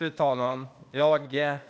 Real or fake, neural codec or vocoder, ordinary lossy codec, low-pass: fake; codec, 16 kHz, 0.8 kbps, ZipCodec; none; none